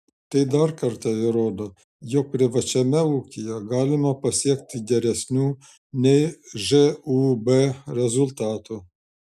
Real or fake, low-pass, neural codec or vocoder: real; 14.4 kHz; none